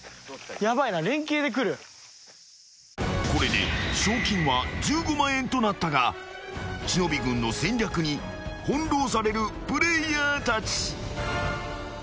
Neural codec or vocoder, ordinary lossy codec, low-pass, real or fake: none; none; none; real